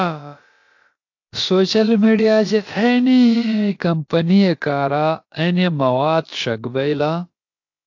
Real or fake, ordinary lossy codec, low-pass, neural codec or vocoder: fake; AAC, 48 kbps; 7.2 kHz; codec, 16 kHz, about 1 kbps, DyCAST, with the encoder's durations